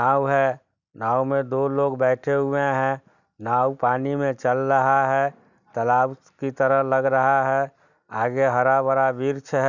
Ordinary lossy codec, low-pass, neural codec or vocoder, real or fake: none; 7.2 kHz; none; real